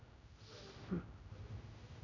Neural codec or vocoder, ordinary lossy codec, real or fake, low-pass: codec, 16 kHz, 0.5 kbps, X-Codec, HuBERT features, trained on general audio; none; fake; 7.2 kHz